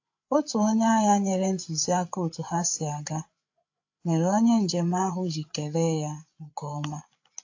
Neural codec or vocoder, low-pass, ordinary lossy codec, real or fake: codec, 16 kHz, 8 kbps, FreqCodec, larger model; 7.2 kHz; AAC, 48 kbps; fake